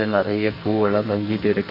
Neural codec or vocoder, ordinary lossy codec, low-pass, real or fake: codec, 44.1 kHz, 2.6 kbps, SNAC; none; 5.4 kHz; fake